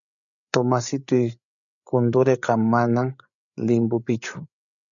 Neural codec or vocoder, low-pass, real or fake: codec, 16 kHz, 8 kbps, FreqCodec, larger model; 7.2 kHz; fake